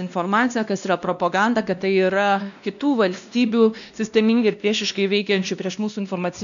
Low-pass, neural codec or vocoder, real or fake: 7.2 kHz; codec, 16 kHz, 1 kbps, X-Codec, WavLM features, trained on Multilingual LibriSpeech; fake